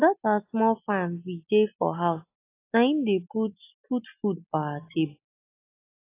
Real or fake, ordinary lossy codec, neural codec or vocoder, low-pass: real; AAC, 24 kbps; none; 3.6 kHz